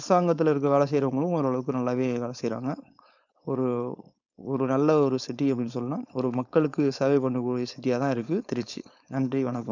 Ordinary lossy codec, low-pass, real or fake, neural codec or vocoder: none; 7.2 kHz; fake; codec, 16 kHz, 4.8 kbps, FACodec